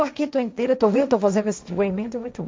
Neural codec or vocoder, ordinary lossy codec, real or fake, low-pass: codec, 16 kHz, 1.1 kbps, Voila-Tokenizer; none; fake; none